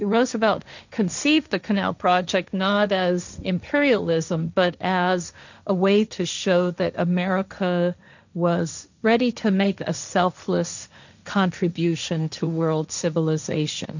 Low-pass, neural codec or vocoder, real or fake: 7.2 kHz; codec, 16 kHz, 1.1 kbps, Voila-Tokenizer; fake